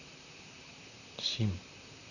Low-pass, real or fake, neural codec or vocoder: 7.2 kHz; fake; vocoder, 44.1 kHz, 128 mel bands, Pupu-Vocoder